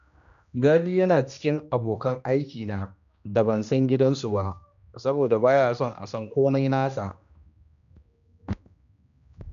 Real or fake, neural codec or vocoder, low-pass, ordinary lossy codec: fake; codec, 16 kHz, 1 kbps, X-Codec, HuBERT features, trained on general audio; 7.2 kHz; none